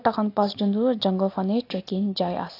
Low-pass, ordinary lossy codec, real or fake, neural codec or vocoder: 5.4 kHz; AAC, 32 kbps; real; none